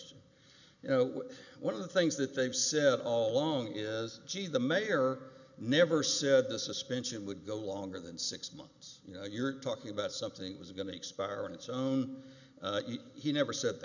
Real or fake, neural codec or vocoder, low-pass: real; none; 7.2 kHz